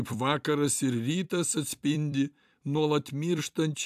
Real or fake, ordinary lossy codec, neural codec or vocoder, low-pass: fake; AAC, 96 kbps; vocoder, 44.1 kHz, 128 mel bands every 256 samples, BigVGAN v2; 14.4 kHz